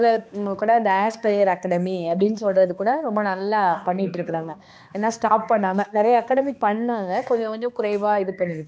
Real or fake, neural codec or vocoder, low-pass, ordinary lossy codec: fake; codec, 16 kHz, 2 kbps, X-Codec, HuBERT features, trained on balanced general audio; none; none